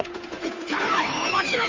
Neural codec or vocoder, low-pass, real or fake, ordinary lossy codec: codec, 16 kHz, 2 kbps, FunCodec, trained on Chinese and English, 25 frames a second; 7.2 kHz; fake; Opus, 32 kbps